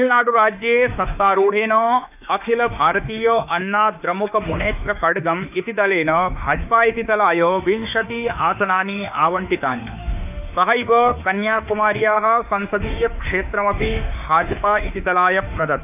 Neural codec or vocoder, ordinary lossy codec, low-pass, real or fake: autoencoder, 48 kHz, 32 numbers a frame, DAC-VAE, trained on Japanese speech; none; 3.6 kHz; fake